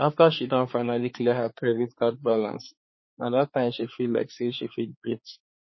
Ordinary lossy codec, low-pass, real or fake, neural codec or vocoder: MP3, 24 kbps; 7.2 kHz; fake; codec, 16 kHz, 4 kbps, X-Codec, HuBERT features, trained on balanced general audio